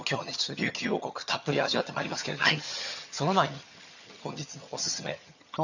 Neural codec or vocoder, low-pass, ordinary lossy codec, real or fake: vocoder, 22.05 kHz, 80 mel bands, HiFi-GAN; 7.2 kHz; none; fake